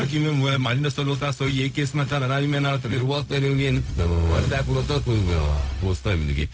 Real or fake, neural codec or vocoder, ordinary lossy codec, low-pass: fake; codec, 16 kHz, 0.4 kbps, LongCat-Audio-Codec; none; none